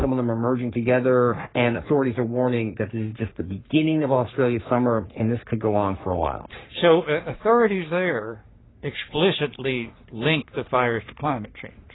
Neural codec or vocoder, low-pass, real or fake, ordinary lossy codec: codec, 44.1 kHz, 3.4 kbps, Pupu-Codec; 7.2 kHz; fake; AAC, 16 kbps